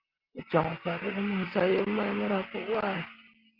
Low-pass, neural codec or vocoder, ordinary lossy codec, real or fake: 5.4 kHz; none; Opus, 24 kbps; real